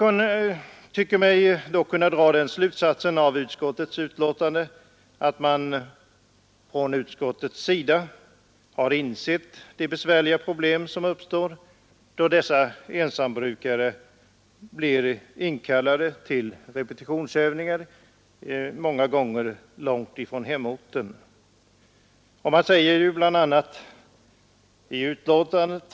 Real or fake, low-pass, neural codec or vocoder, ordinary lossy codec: real; none; none; none